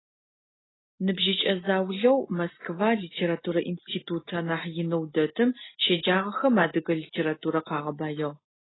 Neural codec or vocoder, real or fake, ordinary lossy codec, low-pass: none; real; AAC, 16 kbps; 7.2 kHz